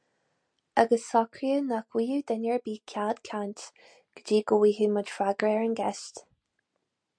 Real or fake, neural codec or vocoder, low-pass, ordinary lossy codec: real; none; 9.9 kHz; MP3, 64 kbps